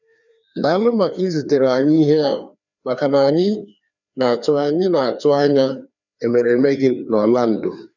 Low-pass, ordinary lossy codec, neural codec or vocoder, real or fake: 7.2 kHz; none; codec, 16 kHz, 2 kbps, FreqCodec, larger model; fake